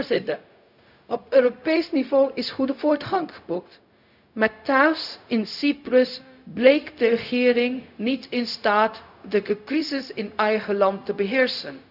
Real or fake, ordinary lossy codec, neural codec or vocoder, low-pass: fake; none; codec, 16 kHz, 0.4 kbps, LongCat-Audio-Codec; 5.4 kHz